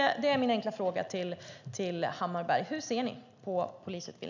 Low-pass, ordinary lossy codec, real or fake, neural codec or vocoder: 7.2 kHz; none; real; none